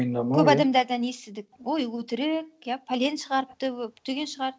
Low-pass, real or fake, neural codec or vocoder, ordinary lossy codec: none; real; none; none